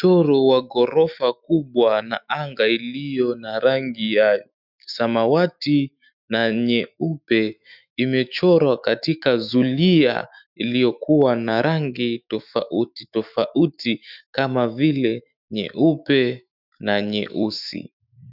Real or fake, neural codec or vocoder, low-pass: fake; autoencoder, 48 kHz, 128 numbers a frame, DAC-VAE, trained on Japanese speech; 5.4 kHz